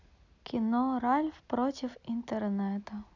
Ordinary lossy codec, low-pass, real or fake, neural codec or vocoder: none; 7.2 kHz; real; none